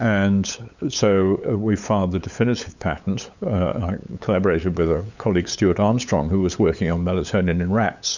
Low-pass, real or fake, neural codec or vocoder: 7.2 kHz; fake; codec, 16 kHz, 8 kbps, FunCodec, trained on LibriTTS, 25 frames a second